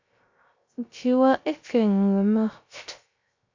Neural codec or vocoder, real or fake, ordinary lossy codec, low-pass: codec, 16 kHz, 0.3 kbps, FocalCodec; fake; MP3, 64 kbps; 7.2 kHz